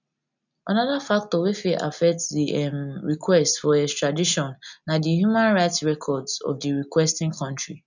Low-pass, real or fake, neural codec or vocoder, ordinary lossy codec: 7.2 kHz; real; none; none